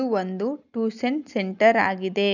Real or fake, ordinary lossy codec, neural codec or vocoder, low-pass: real; none; none; 7.2 kHz